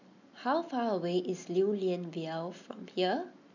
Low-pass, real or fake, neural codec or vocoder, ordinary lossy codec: 7.2 kHz; real; none; none